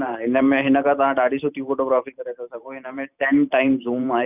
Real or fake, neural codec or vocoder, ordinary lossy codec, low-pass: fake; vocoder, 44.1 kHz, 128 mel bands every 512 samples, BigVGAN v2; none; 3.6 kHz